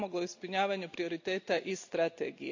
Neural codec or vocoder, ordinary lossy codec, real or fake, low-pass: none; AAC, 48 kbps; real; 7.2 kHz